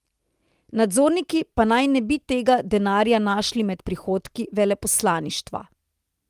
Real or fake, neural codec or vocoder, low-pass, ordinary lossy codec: real; none; 14.4 kHz; Opus, 24 kbps